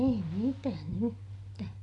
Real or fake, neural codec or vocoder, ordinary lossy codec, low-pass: real; none; none; 10.8 kHz